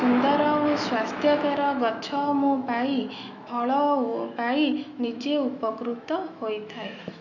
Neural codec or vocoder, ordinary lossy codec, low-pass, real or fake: autoencoder, 48 kHz, 128 numbers a frame, DAC-VAE, trained on Japanese speech; none; 7.2 kHz; fake